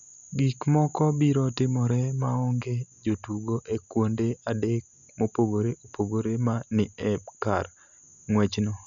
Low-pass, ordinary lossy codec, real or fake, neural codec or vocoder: 7.2 kHz; none; real; none